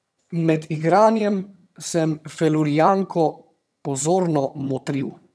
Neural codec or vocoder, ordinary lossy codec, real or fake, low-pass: vocoder, 22.05 kHz, 80 mel bands, HiFi-GAN; none; fake; none